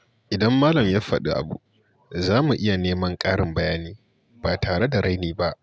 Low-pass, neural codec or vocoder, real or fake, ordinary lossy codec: none; none; real; none